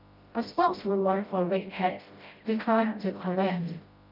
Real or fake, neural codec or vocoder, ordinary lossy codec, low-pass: fake; codec, 16 kHz, 0.5 kbps, FreqCodec, smaller model; Opus, 24 kbps; 5.4 kHz